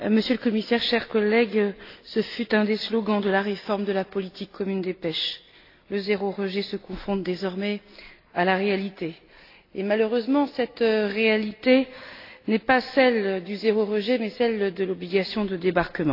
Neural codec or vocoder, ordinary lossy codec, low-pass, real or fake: none; AAC, 32 kbps; 5.4 kHz; real